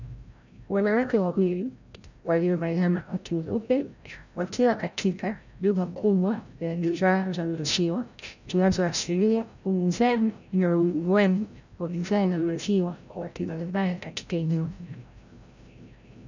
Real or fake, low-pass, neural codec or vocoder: fake; 7.2 kHz; codec, 16 kHz, 0.5 kbps, FreqCodec, larger model